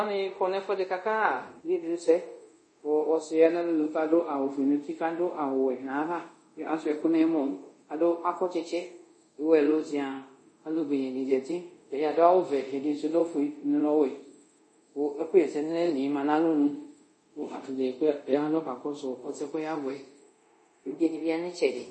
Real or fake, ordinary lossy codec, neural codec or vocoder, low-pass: fake; MP3, 32 kbps; codec, 24 kHz, 0.5 kbps, DualCodec; 9.9 kHz